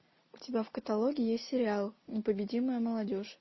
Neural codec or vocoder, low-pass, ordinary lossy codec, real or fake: none; 7.2 kHz; MP3, 24 kbps; real